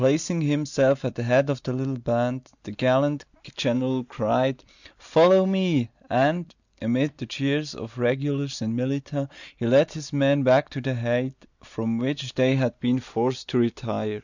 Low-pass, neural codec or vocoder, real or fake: 7.2 kHz; none; real